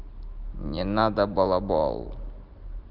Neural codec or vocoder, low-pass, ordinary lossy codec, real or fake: none; 5.4 kHz; Opus, 32 kbps; real